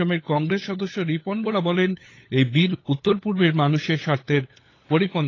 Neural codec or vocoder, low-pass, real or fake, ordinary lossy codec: codec, 16 kHz, 8 kbps, FunCodec, trained on LibriTTS, 25 frames a second; 7.2 kHz; fake; AAC, 32 kbps